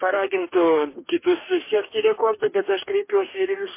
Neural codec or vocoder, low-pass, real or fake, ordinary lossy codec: codec, 44.1 kHz, 2.6 kbps, DAC; 3.6 kHz; fake; MP3, 24 kbps